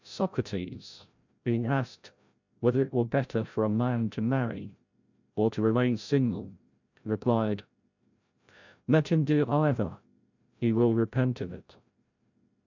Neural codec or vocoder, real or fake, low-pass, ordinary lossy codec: codec, 16 kHz, 0.5 kbps, FreqCodec, larger model; fake; 7.2 kHz; MP3, 64 kbps